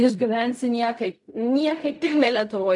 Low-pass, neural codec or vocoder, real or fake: 10.8 kHz; codec, 16 kHz in and 24 kHz out, 0.4 kbps, LongCat-Audio-Codec, fine tuned four codebook decoder; fake